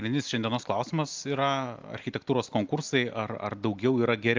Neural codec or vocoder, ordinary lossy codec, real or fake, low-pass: none; Opus, 24 kbps; real; 7.2 kHz